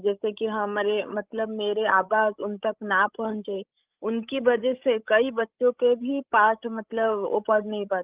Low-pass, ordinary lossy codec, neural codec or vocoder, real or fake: 3.6 kHz; Opus, 32 kbps; codec, 16 kHz, 16 kbps, FreqCodec, larger model; fake